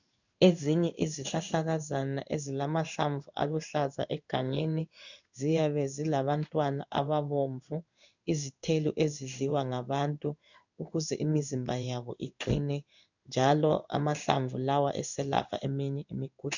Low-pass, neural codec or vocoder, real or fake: 7.2 kHz; codec, 16 kHz in and 24 kHz out, 1 kbps, XY-Tokenizer; fake